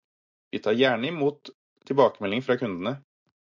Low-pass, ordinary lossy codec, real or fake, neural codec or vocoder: 7.2 kHz; MP3, 64 kbps; real; none